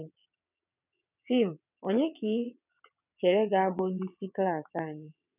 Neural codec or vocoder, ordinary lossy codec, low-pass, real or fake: vocoder, 22.05 kHz, 80 mel bands, WaveNeXt; none; 3.6 kHz; fake